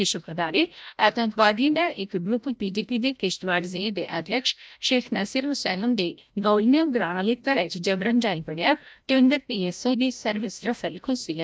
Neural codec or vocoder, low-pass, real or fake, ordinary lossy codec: codec, 16 kHz, 0.5 kbps, FreqCodec, larger model; none; fake; none